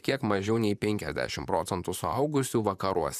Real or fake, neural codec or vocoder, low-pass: fake; vocoder, 44.1 kHz, 128 mel bands, Pupu-Vocoder; 14.4 kHz